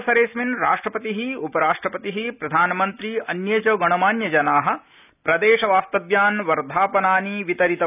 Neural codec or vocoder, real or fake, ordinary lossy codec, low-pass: none; real; none; 3.6 kHz